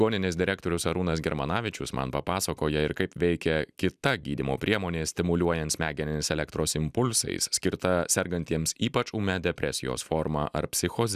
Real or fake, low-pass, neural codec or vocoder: real; 14.4 kHz; none